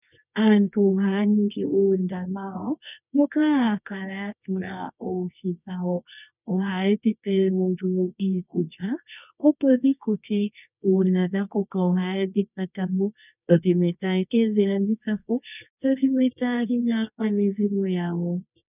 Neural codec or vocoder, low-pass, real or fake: codec, 24 kHz, 0.9 kbps, WavTokenizer, medium music audio release; 3.6 kHz; fake